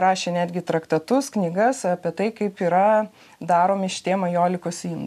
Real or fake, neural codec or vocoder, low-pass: real; none; 14.4 kHz